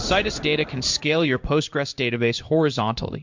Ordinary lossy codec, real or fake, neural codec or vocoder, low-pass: MP3, 64 kbps; fake; codec, 16 kHz in and 24 kHz out, 1 kbps, XY-Tokenizer; 7.2 kHz